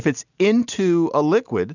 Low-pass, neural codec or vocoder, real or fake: 7.2 kHz; none; real